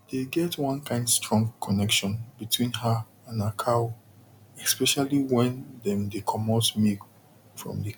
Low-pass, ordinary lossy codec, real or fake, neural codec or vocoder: 19.8 kHz; none; real; none